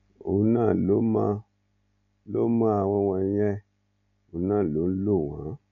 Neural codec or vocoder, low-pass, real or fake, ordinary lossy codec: none; 7.2 kHz; real; none